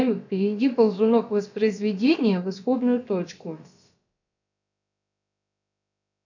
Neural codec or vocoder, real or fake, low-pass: codec, 16 kHz, about 1 kbps, DyCAST, with the encoder's durations; fake; 7.2 kHz